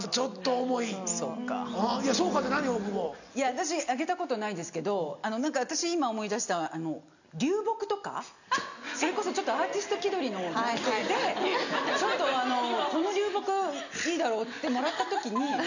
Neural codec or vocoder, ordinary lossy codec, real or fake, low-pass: none; none; real; 7.2 kHz